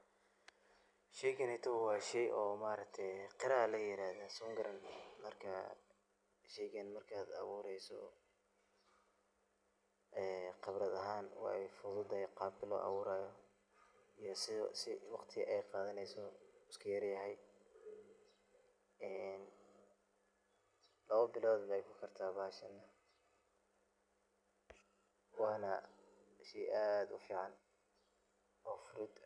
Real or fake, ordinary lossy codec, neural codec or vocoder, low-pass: real; none; none; 9.9 kHz